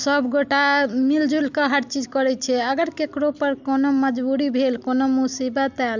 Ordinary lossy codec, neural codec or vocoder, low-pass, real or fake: none; none; 7.2 kHz; real